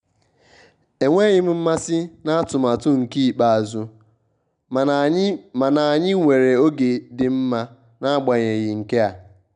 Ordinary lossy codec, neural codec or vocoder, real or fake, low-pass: none; none; real; 9.9 kHz